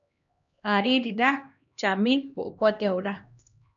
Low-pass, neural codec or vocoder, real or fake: 7.2 kHz; codec, 16 kHz, 1 kbps, X-Codec, HuBERT features, trained on LibriSpeech; fake